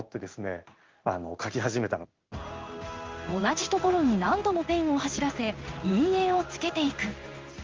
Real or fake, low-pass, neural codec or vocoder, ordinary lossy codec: fake; 7.2 kHz; codec, 16 kHz in and 24 kHz out, 1 kbps, XY-Tokenizer; Opus, 32 kbps